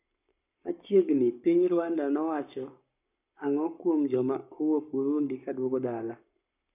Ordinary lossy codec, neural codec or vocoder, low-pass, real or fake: none; codec, 44.1 kHz, 7.8 kbps, Pupu-Codec; 3.6 kHz; fake